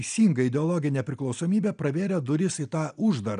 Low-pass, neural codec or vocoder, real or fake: 9.9 kHz; none; real